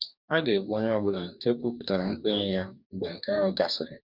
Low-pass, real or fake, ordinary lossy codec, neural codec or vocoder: 5.4 kHz; fake; none; codec, 44.1 kHz, 2.6 kbps, DAC